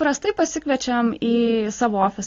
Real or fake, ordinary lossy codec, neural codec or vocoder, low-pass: real; AAC, 32 kbps; none; 7.2 kHz